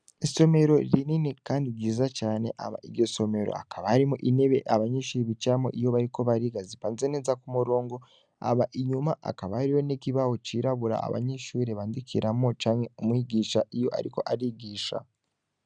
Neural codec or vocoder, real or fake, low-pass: none; real; 9.9 kHz